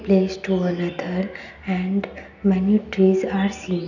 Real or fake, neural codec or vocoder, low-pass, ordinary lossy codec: real; none; 7.2 kHz; none